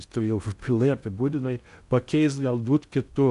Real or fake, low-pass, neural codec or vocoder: fake; 10.8 kHz; codec, 16 kHz in and 24 kHz out, 0.6 kbps, FocalCodec, streaming, 2048 codes